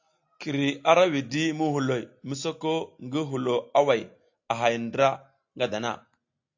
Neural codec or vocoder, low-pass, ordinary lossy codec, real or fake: none; 7.2 kHz; AAC, 48 kbps; real